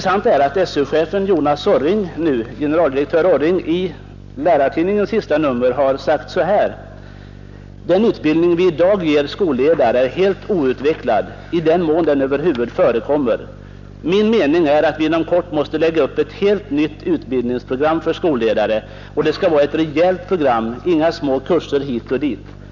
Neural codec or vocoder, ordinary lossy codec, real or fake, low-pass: none; none; real; 7.2 kHz